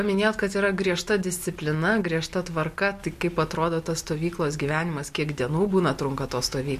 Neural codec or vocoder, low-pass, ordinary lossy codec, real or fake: none; 14.4 kHz; MP3, 64 kbps; real